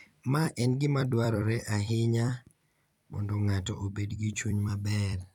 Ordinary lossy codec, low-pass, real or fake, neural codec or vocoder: none; 19.8 kHz; fake; vocoder, 44.1 kHz, 128 mel bands every 256 samples, BigVGAN v2